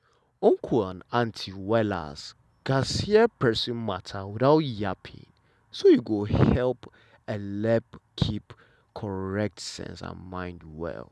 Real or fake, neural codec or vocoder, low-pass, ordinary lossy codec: real; none; none; none